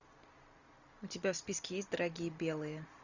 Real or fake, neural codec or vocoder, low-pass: real; none; 7.2 kHz